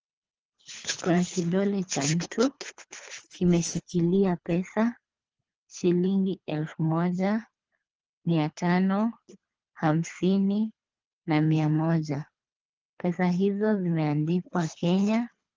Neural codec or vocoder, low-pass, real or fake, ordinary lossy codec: codec, 24 kHz, 3 kbps, HILCodec; 7.2 kHz; fake; Opus, 24 kbps